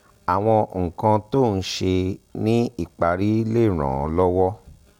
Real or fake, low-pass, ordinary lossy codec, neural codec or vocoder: real; 19.8 kHz; MP3, 96 kbps; none